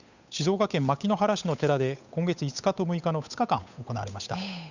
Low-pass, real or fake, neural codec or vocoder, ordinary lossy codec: 7.2 kHz; fake; codec, 16 kHz, 8 kbps, FunCodec, trained on Chinese and English, 25 frames a second; none